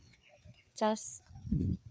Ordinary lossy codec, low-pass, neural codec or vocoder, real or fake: none; none; codec, 16 kHz, 4 kbps, FreqCodec, larger model; fake